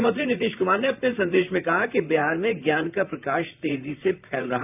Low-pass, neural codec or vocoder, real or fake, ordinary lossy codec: 3.6 kHz; vocoder, 44.1 kHz, 128 mel bands, Pupu-Vocoder; fake; none